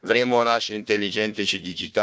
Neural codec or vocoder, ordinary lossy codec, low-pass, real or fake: codec, 16 kHz, 1 kbps, FunCodec, trained on Chinese and English, 50 frames a second; none; none; fake